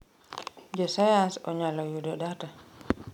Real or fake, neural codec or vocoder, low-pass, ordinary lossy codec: real; none; 19.8 kHz; none